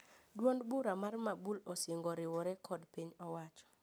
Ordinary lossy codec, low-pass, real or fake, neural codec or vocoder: none; none; real; none